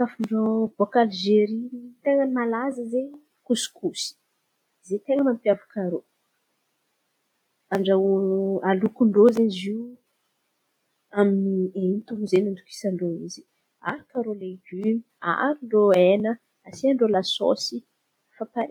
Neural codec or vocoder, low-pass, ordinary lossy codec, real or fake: none; 19.8 kHz; none; real